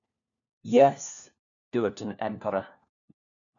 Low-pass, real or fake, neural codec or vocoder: 7.2 kHz; fake; codec, 16 kHz, 1 kbps, FunCodec, trained on LibriTTS, 50 frames a second